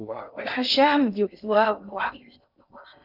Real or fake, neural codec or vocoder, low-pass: fake; codec, 16 kHz in and 24 kHz out, 0.6 kbps, FocalCodec, streaming, 2048 codes; 5.4 kHz